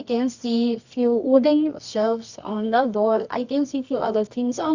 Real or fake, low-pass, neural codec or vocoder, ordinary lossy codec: fake; 7.2 kHz; codec, 24 kHz, 0.9 kbps, WavTokenizer, medium music audio release; Opus, 64 kbps